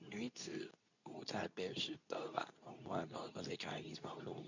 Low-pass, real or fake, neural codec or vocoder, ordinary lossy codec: 7.2 kHz; fake; codec, 24 kHz, 0.9 kbps, WavTokenizer, medium speech release version 2; none